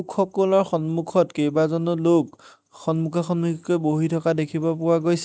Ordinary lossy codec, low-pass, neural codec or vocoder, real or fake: none; none; none; real